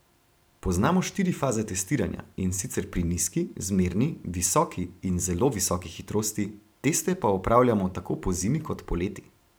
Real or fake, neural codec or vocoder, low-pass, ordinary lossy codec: fake; vocoder, 44.1 kHz, 128 mel bands every 512 samples, BigVGAN v2; none; none